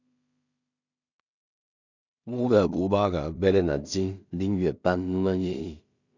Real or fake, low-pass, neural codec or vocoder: fake; 7.2 kHz; codec, 16 kHz in and 24 kHz out, 0.4 kbps, LongCat-Audio-Codec, two codebook decoder